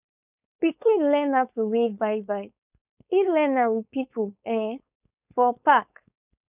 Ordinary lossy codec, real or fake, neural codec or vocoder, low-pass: none; fake; codec, 16 kHz, 4.8 kbps, FACodec; 3.6 kHz